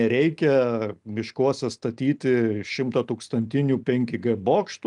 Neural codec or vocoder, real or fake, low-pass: none; real; 10.8 kHz